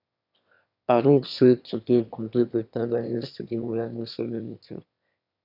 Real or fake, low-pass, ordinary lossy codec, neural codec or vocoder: fake; 5.4 kHz; none; autoencoder, 22.05 kHz, a latent of 192 numbers a frame, VITS, trained on one speaker